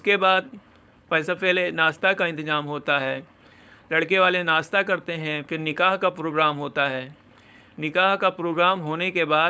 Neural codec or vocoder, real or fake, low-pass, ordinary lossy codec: codec, 16 kHz, 4.8 kbps, FACodec; fake; none; none